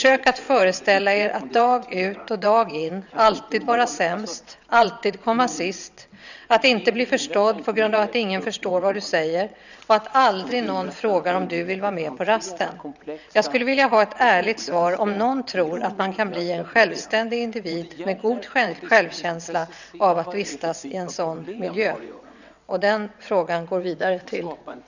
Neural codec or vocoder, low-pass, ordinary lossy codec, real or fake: vocoder, 22.05 kHz, 80 mel bands, WaveNeXt; 7.2 kHz; none; fake